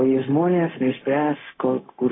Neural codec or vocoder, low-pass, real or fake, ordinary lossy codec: codec, 16 kHz, 0.4 kbps, LongCat-Audio-Codec; 7.2 kHz; fake; AAC, 16 kbps